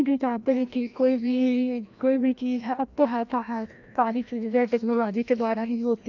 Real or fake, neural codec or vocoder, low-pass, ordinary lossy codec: fake; codec, 16 kHz, 1 kbps, FreqCodec, larger model; 7.2 kHz; none